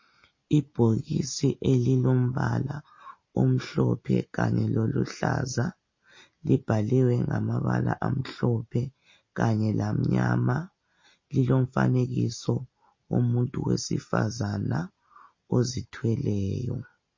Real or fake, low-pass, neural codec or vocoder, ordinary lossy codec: real; 7.2 kHz; none; MP3, 32 kbps